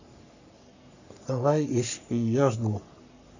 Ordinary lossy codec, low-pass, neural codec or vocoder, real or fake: none; 7.2 kHz; codec, 44.1 kHz, 3.4 kbps, Pupu-Codec; fake